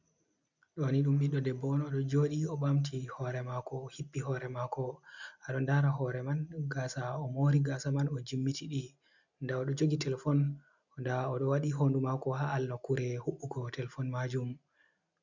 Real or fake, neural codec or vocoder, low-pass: real; none; 7.2 kHz